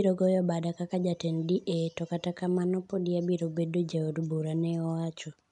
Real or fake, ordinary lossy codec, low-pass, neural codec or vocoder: real; none; 10.8 kHz; none